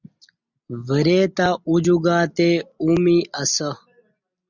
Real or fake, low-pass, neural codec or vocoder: real; 7.2 kHz; none